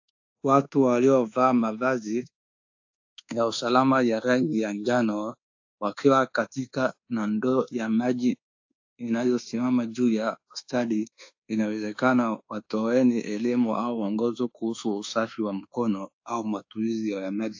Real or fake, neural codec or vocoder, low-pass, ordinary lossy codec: fake; codec, 24 kHz, 1.2 kbps, DualCodec; 7.2 kHz; AAC, 48 kbps